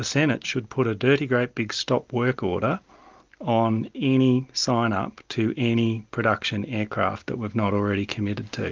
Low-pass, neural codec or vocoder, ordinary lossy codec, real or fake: 7.2 kHz; none; Opus, 32 kbps; real